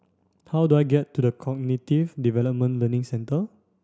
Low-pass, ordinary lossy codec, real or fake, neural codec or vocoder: none; none; real; none